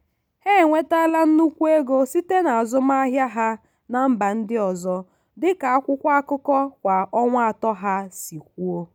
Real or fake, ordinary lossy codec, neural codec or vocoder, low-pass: real; none; none; 19.8 kHz